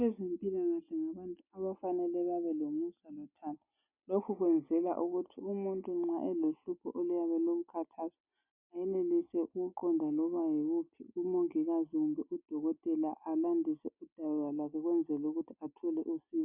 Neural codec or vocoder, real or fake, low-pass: none; real; 3.6 kHz